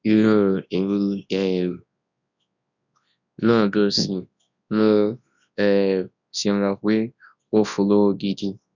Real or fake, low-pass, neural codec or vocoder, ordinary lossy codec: fake; 7.2 kHz; codec, 24 kHz, 0.9 kbps, WavTokenizer, large speech release; none